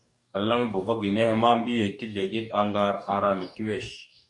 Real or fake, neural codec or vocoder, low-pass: fake; codec, 44.1 kHz, 2.6 kbps, DAC; 10.8 kHz